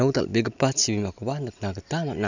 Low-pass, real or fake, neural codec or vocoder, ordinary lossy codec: 7.2 kHz; real; none; none